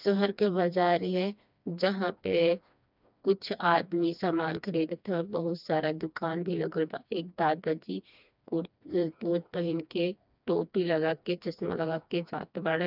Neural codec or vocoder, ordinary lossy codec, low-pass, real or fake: codec, 16 kHz, 2 kbps, FreqCodec, smaller model; none; 5.4 kHz; fake